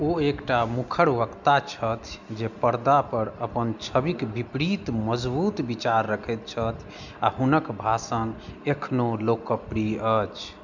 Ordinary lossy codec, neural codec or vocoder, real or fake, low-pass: none; none; real; 7.2 kHz